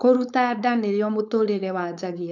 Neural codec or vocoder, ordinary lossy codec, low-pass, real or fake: codec, 16 kHz, 4.8 kbps, FACodec; none; 7.2 kHz; fake